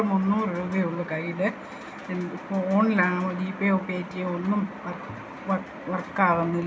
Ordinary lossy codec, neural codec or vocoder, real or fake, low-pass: none; none; real; none